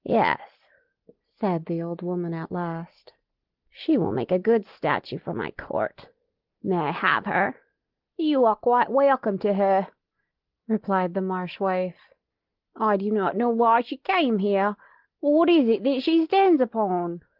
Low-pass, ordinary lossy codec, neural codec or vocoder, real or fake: 5.4 kHz; Opus, 16 kbps; none; real